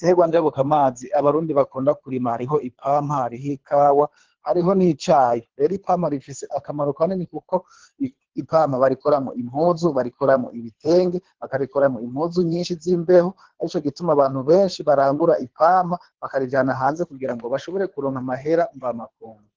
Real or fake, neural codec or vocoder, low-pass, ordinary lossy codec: fake; codec, 24 kHz, 3 kbps, HILCodec; 7.2 kHz; Opus, 16 kbps